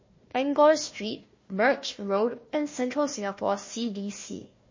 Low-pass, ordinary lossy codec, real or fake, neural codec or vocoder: 7.2 kHz; MP3, 32 kbps; fake; codec, 16 kHz, 1 kbps, FunCodec, trained on Chinese and English, 50 frames a second